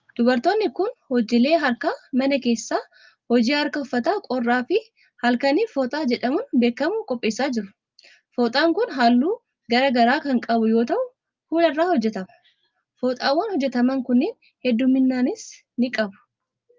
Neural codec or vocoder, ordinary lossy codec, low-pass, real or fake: none; Opus, 32 kbps; 7.2 kHz; real